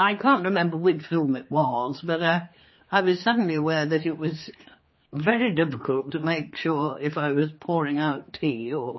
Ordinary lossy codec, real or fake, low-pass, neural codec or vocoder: MP3, 24 kbps; fake; 7.2 kHz; codec, 16 kHz, 4 kbps, X-Codec, HuBERT features, trained on general audio